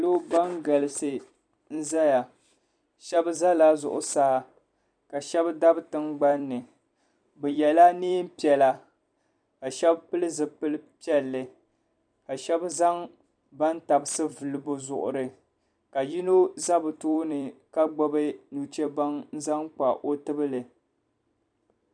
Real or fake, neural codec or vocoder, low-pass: fake; vocoder, 44.1 kHz, 128 mel bands every 256 samples, BigVGAN v2; 9.9 kHz